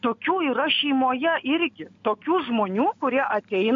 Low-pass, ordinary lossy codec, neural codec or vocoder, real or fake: 7.2 kHz; MP3, 48 kbps; none; real